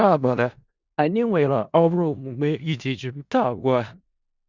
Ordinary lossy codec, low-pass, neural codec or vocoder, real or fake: Opus, 64 kbps; 7.2 kHz; codec, 16 kHz in and 24 kHz out, 0.4 kbps, LongCat-Audio-Codec, four codebook decoder; fake